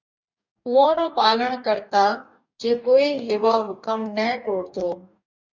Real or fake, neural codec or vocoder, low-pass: fake; codec, 44.1 kHz, 2.6 kbps, DAC; 7.2 kHz